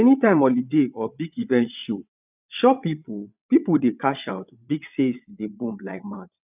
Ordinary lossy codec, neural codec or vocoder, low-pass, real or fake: none; vocoder, 22.05 kHz, 80 mel bands, WaveNeXt; 3.6 kHz; fake